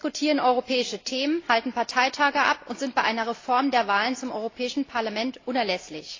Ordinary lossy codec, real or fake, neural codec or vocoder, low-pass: AAC, 32 kbps; real; none; 7.2 kHz